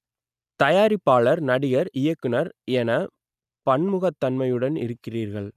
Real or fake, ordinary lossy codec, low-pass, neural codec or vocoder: real; none; 14.4 kHz; none